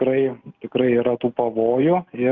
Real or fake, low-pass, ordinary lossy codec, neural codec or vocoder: real; 7.2 kHz; Opus, 32 kbps; none